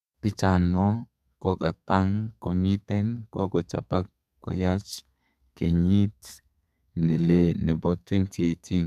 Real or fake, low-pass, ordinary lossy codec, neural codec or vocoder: fake; 14.4 kHz; none; codec, 32 kHz, 1.9 kbps, SNAC